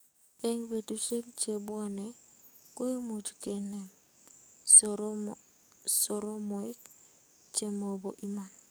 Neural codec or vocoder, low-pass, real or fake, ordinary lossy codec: codec, 44.1 kHz, 7.8 kbps, DAC; none; fake; none